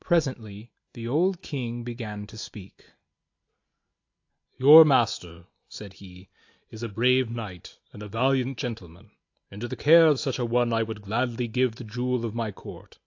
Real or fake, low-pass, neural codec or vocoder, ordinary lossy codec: real; 7.2 kHz; none; AAC, 48 kbps